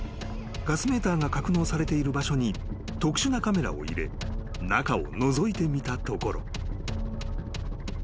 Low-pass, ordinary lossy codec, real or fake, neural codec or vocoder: none; none; real; none